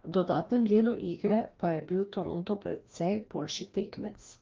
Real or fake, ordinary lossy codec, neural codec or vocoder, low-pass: fake; Opus, 24 kbps; codec, 16 kHz, 1 kbps, FreqCodec, larger model; 7.2 kHz